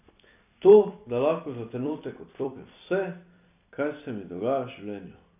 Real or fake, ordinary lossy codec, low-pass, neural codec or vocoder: fake; none; 3.6 kHz; vocoder, 44.1 kHz, 128 mel bands every 256 samples, BigVGAN v2